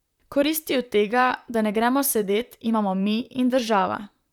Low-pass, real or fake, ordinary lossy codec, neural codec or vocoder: 19.8 kHz; fake; none; vocoder, 44.1 kHz, 128 mel bands, Pupu-Vocoder